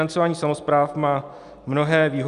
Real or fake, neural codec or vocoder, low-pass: real; none; 10.8 kHz